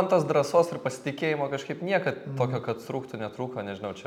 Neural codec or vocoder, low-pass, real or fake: none; 19.8 kHz; real